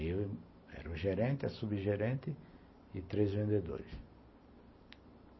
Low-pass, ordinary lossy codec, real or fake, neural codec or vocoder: 7.2 kHz; MP3, 24 kbps; real; none